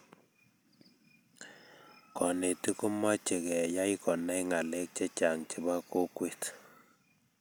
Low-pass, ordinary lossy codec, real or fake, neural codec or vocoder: none; none; real; none